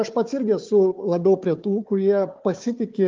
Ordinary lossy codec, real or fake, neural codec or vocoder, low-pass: Opus, 24 kbps; fake; codec, 16 kHz, 4 kbps, FunCodec, trained on Chinese and English, 50 frames a second; 7.2 kHz